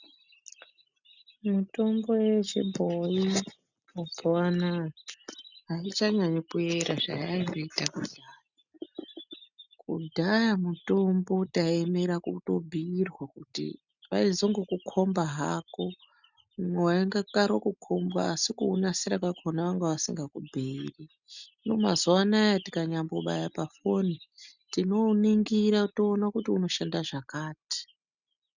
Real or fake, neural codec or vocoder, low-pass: real; none; 7.2 kHz